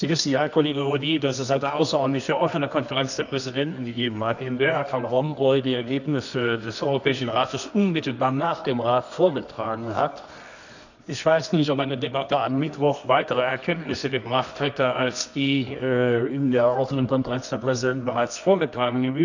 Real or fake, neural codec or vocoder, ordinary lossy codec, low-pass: fake; codec, 24 kHz, 0.9 kbps, WavTokenizer, medium music audio release; none; 7.2 kHz